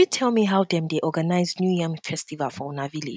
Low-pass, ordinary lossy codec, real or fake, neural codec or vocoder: none; none; real; none